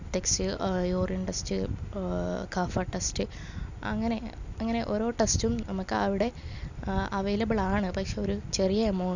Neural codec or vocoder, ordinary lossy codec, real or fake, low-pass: none; none; real; 7.2 kHz